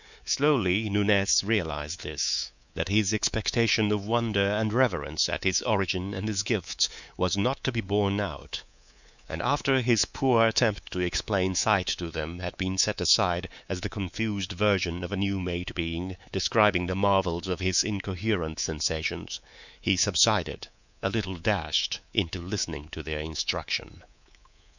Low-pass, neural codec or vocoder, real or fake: 7.2 kHz; codec, 24 kHz, 3.1 kbps, DualCodec; fake